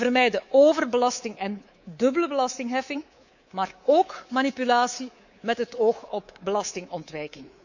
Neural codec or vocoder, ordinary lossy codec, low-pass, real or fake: codec, 24 kHz, 3.1 kbps, DualCodec; none; 7.2 kHz; fake